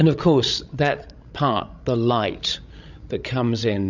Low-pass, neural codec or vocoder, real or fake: 7.2 kHz; codec, 16 kHz, 16 kbps, FreqCodec, larger model; fake